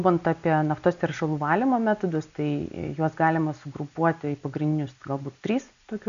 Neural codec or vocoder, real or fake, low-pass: none; real; 7.2 kHz